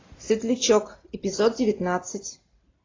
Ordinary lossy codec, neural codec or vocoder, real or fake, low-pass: AAC, 32 kbps; none; real; 7.2 kHz